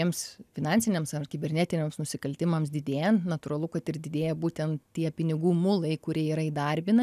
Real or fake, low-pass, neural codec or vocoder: real; 14.4 kHz; none